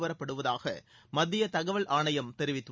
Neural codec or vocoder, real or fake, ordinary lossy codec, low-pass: none; real; none; none